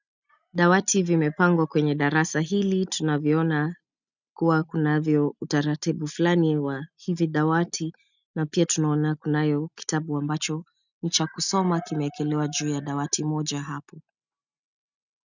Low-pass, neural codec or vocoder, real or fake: 7.2 kHz; none; real